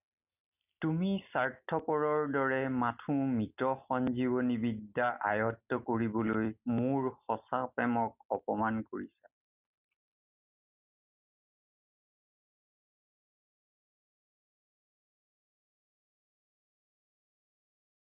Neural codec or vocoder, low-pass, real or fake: none; 3.6 kHz; real